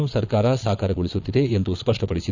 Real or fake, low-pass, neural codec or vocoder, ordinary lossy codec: fake; 7.2 kHz; vocoder, 22.05 kHz, 80 mel bands, Vocos; none